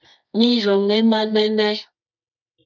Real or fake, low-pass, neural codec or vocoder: fake; 7.2 kHz; codec, 24 kHz, 0.9 kbps, WavTokenizer, medium music audio release